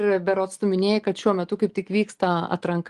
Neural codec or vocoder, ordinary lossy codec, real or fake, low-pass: none; Opus, 24 kbps; real; 10.8 kHz